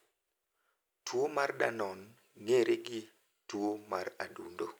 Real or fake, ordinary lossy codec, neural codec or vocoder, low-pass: real; none; none; none